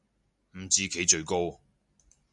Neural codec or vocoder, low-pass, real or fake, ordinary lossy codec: none; 10.8 kHz; real; MP3, 96 kbps